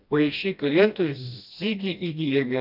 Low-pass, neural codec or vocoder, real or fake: 5.4 kHz; codec, 16 kHz, 1 kbps, FreqCodec, smaller model; fake